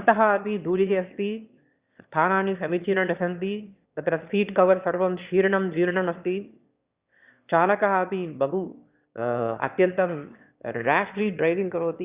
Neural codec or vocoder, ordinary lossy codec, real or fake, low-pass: autoencoder, 22.05 kHz, a latent of 192 numbers a frame, VITS, trained on one speaker; Opus, 64 kbps; fake; 3.6 kHz